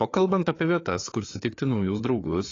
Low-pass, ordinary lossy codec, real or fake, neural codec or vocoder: 7.2 kHz; AAC, 32 kbps; fake; codec, 16 kHz, 4 kbps, FreqCodec, larger model